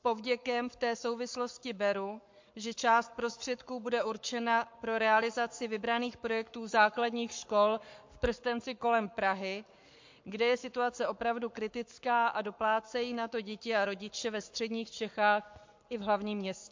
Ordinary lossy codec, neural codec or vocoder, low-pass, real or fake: MP3, 48 kbps; codec, 44.1 kHz, 7.8 kbps, Pupu-Codec; 7.2 kHz; fake